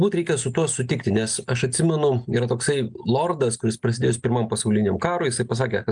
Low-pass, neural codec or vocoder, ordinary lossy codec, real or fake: 9.9 kHz; none; Opus, 32 kbps; real